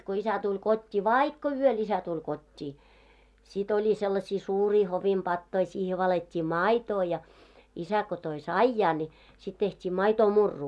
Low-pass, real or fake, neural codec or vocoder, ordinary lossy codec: none; real; none; none